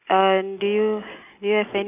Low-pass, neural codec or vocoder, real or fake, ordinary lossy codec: 3.6 kHz; none; real; none